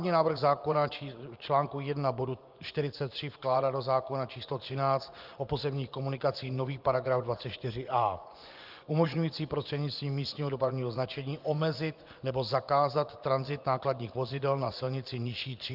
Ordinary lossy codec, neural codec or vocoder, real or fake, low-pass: Opus, 24 kbps; vocoder, 24 kHz, 100 mel bands, Vocos; fake; 5.4 kHz